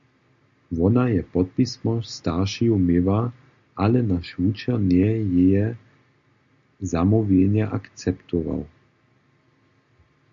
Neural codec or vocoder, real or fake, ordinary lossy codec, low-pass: none; real; MP3, 64 kbps; 7.2 kHz